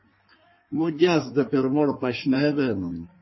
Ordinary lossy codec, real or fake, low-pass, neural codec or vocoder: MP3, 24 kbps; fake; 7.2 kHz; codec, 16 kHz in and 24 kHz out, 1.1 kbps, FireRedTTS-2 codec